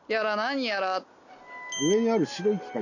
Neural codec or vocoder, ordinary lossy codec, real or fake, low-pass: none; none; real; 7.2 kHz